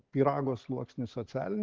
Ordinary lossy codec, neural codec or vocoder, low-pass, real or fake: Opus, 16 kbps; none; 7.2 kHz; real